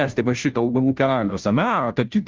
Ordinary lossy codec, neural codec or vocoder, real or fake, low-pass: Opus, 32 kbps; codec, 16 kHz, 0.5 kbps, FunCodec, trained on Chinese and English, 25 frames a second; fake; 7.2 kHz